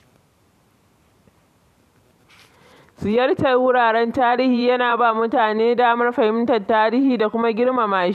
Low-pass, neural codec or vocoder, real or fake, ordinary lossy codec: 14.4 kHz; vocoder, 44.1 kHz, 128 mel bands every 512 samples, BigVGAN v2; fake; none